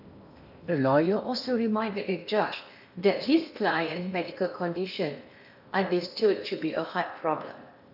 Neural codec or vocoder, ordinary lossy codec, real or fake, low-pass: codec, 16 kHz in and 24 kHz out, 0.8 kbps, FocalCodec, streaming, 65536 codes; none; fake; 5.4 kHz